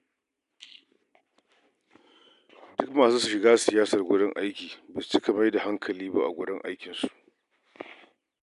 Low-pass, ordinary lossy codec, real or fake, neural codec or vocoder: 10.8 kHz; none; real; none